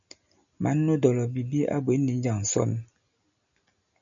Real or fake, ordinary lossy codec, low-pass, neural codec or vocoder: real; MP3, 96 kbps; 7.2 kHz; none